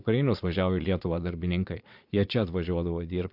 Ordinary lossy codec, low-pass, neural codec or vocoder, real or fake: MP3, 48 kbps; 5.4 kHz; vocoder, 22.05 kHz, 80 mel bands, Vocos; fake